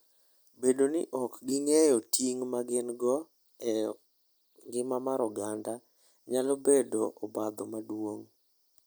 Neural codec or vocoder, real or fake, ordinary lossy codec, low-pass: none; real; none; none